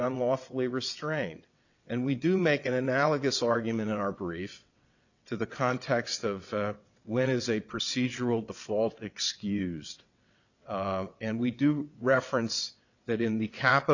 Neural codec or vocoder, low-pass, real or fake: vocoder, 22.05 kHz, 80 mel bands, WaveNeXt; 7.2 kHz; fake